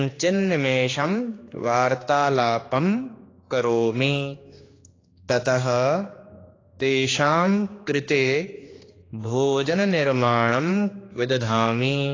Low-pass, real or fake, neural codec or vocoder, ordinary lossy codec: 7.2 kHz; fake; codec, 16 kHz, 2 kbps, X-Codec, HuBERT features, trained on general audio; AAC, 32 kbps